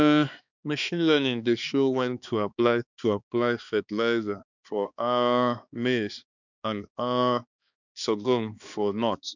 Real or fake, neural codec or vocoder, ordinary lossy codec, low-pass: fake; codec, 16 kHz, 2 kbps, X-Codec, HuBERT features, trained on balanced general audio; none; 7.2 kHz